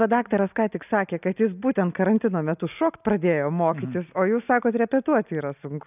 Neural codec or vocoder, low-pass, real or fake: none; 3.6 kHz; real